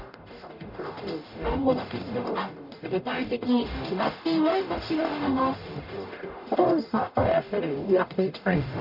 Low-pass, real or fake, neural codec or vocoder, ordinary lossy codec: 5.4 kHz; fake; codec, 44.1 kHz, 0.9 kbps, DAC; none